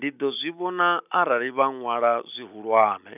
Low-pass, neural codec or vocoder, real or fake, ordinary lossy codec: 3.6 kHz; none; real; none